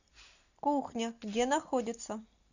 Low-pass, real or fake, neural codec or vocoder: 7.2 kHz; real; none